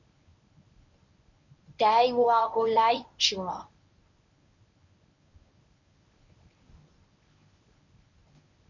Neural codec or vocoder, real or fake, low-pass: codec, 24 kHz, 0.9 kbps, WavTokenizer, medium speech release version 1; fake; 7.2 kHz